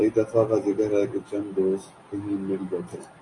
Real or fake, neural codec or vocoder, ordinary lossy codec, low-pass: real; none; AAC, 32 kbps; 10.8 kHz